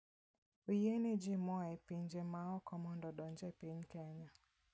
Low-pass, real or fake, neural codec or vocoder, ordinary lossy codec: none; real; none; none